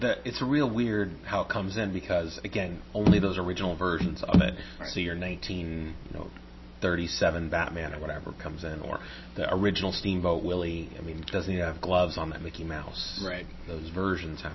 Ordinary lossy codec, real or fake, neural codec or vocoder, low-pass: MP3, 24 kbps; real; none; 7.2 kHz